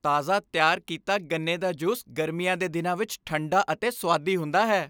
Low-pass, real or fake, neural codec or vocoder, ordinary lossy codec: none; real; none; none